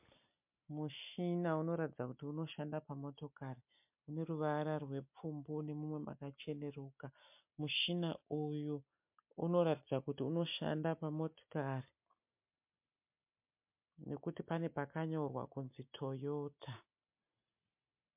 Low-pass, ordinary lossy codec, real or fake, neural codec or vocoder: 3.6 kHz; MP3, 32 kbps; real; none